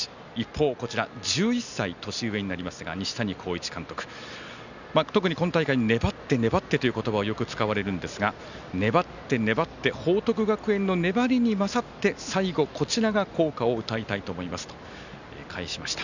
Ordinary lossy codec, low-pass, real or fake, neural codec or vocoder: none; 7.2 kHz; real; none